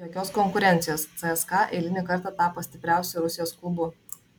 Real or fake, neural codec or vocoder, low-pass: real; none; 19.8 kHz